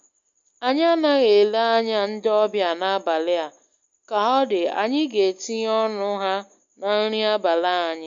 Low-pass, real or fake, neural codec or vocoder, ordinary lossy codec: 7.2 kHz; real; none; MP3, 48 kbps